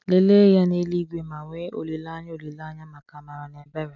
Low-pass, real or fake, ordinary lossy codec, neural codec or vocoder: 7.2 kHz; real; none; none